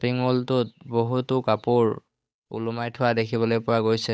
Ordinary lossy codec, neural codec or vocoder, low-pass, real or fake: none; codec, 16 kHz, 8 kbps, FunCodec, trained on Chinese and English, 25 frames a second; none; fake